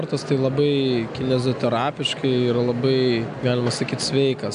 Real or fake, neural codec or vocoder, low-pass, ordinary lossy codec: real; none; 9.9 kHz; AAC, 96 kbps